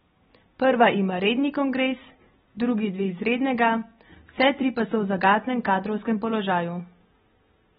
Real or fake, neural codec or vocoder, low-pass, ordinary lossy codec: real; none; 7.2 kHz; AAC, 16 kbps